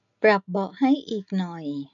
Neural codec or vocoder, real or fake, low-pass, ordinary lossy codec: none; real; 7.2 kHz; none